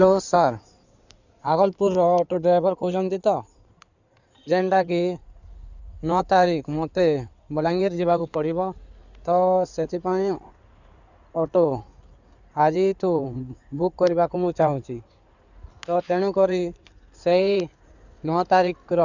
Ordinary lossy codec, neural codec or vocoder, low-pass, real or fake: none; codec, 16 kHz in and 24 kHz out, 2.2 kbps, FireRedTTS-2 codec; 7.2 kHz; fake